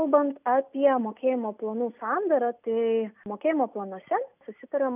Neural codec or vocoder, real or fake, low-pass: none; real; 3.6 kHz